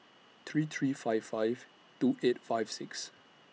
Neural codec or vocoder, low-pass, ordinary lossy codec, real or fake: none; none; none; real